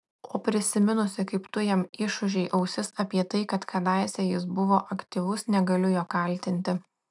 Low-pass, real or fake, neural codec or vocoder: 10.8 kHz; real; none